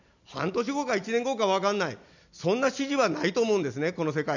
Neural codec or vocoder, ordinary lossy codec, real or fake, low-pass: none; none; real; 7.2 kHz